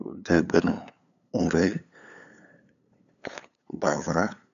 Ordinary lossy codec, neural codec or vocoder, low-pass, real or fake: none; codec, 16 kHz, 8 kbps, FunCodec, trained on LibriTTS, 25 frames a second; 7.2 kHz; fake